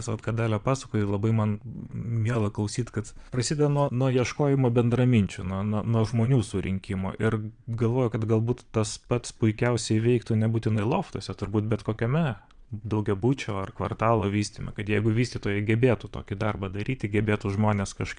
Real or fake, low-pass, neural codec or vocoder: fake; 9.9 kHz; vocoder, 22.05 kHz, 80 mel bands, Vocos